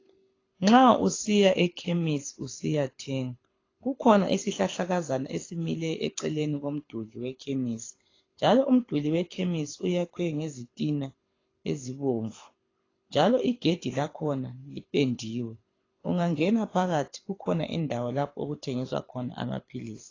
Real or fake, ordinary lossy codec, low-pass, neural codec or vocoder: fake; AAC, 32 kbps; 7.2 kHz; codec, 24 kHz, 6 kbps, HILCodec